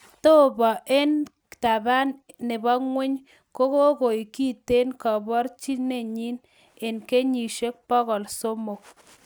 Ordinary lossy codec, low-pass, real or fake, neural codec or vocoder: none; none; real; none